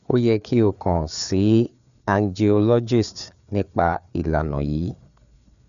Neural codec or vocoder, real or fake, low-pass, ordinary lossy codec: codec, 16 kHz, 4 kbps, FreqCodec, larger model; fake; 7.2 kHz; none